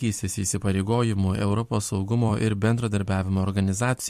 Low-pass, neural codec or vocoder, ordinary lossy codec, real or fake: 14.4 kHz; vocoder, 44.1 kHz, 128 mel bands every 256 samples, BigVGAN v2; MP3, 64 kbps; fake